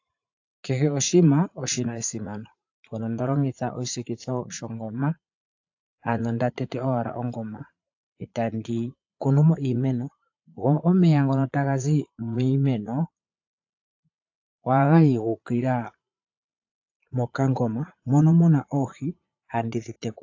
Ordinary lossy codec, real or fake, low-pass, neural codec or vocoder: AAC, 48 kbps; fake; 7.2 kHz; vocoder, 44.1 kHz, 80 mel bands, Vocos